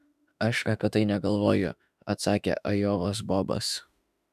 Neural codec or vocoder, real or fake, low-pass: autoencoder, 48 kHz, 32 numbers a frame, DAC-VAE, trained on Japanese speech; fake; 14.4 kHz